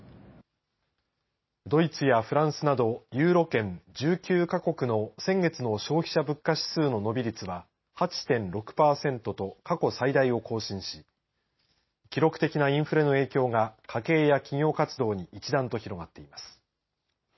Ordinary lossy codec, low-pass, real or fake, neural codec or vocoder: MP3, 24 kbps; 7.2 kHz; real; none